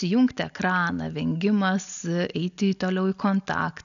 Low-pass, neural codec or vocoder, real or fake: 7.2 kHz; none; real